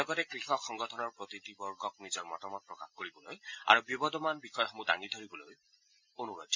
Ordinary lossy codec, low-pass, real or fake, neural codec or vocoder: MP3, 64 kbps; 7.2 kHz; real; none